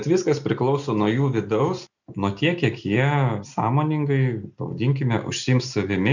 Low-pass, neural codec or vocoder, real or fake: 7.2 kHz; none; real